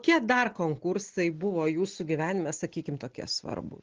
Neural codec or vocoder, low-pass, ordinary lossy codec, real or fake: none; 7.2 kHz; Opus, 16 kbps; real